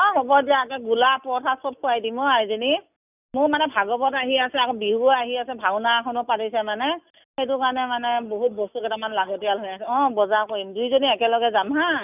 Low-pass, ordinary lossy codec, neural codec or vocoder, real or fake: 3.6 kHz; none; none; real